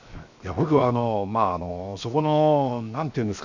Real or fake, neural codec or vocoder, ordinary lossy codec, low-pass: fake; codec, 16 kHz, 0.7 kbps, FocalCodec; none; 7.2 kHz